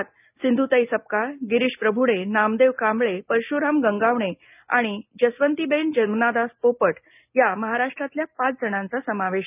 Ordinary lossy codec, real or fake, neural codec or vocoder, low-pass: none; real; none; 3.6 kHz